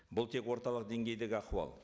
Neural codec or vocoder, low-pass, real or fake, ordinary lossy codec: none; none; real; none